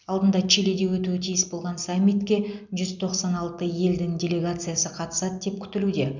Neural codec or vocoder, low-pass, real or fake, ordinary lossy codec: none; 7.2 kHz; real; none